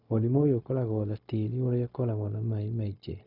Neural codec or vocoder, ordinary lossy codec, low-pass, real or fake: codec, 16 kHz, 0.4 kbps, LongCat-Audio-Codec; none; 5.4 kHz; fake